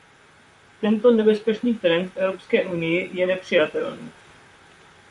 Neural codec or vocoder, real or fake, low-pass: vocoder, 44.1 kHz, 128 mel bands, Pupu-Vocoder; fake; 10.8 kHz